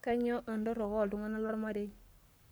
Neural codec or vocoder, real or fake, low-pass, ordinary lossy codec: codec, 44.1 kHz, 7.8 kbps, Pupu-Codec; fake; none; none